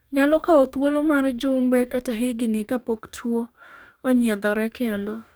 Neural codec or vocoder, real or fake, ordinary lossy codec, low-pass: codec, 44.1 kHz, 2.6 kbps, DAC; fake; none; none